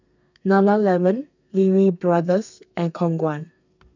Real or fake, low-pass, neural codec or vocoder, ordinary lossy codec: fake; 7.2 kHz; codec, 32 kHz, 1.9 kbps, SNAC; none